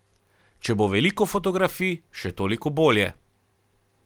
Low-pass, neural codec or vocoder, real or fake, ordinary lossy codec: 19.8 kHz; vocoder, 44.1 kHz, 128 mel bands every 512 samples, BigVGAN v2; fake; Opus, 24 kbps